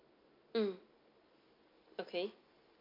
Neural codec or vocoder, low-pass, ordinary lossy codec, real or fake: none; 5.4 kHz; MP3, 32 kbps; real